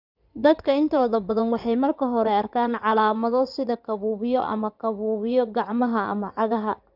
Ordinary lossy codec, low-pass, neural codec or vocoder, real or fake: none; 5.4 kHz; codec, 16 kHz in and 24 kHz out, 2.2 kbps, FireRedTTS-2 codec; fake